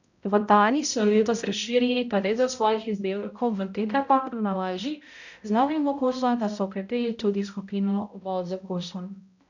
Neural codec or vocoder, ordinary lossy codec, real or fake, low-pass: codec, 16 kHz, 0.5 kbps, X-Codec, HuBERT features, trained on balanced general audio; none; fake; 7.2 kHz